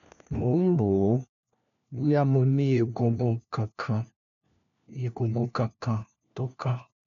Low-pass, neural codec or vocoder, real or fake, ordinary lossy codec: 7.2 kHz; codec, 16 kHz, 1 kbps, FunCodec, trained on LibriTTS, 50 frames a second; fake; none